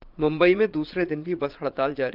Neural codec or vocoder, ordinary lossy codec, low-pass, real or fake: vocoder, 22.05 kHz, 80 mel bands, Vocos; Opus, 24 kbps; 5.4 kHz; fake